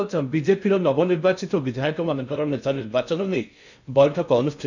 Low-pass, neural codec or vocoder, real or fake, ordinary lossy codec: 7.2 kHz; codec, 16 kHz in and 24 kHz out, 0.6 kbps, FocalCodec, streaming, 2048 codes; fake; none